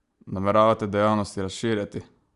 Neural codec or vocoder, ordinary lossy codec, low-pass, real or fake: none; Opus, 64 kbps; 10.8 kHz; real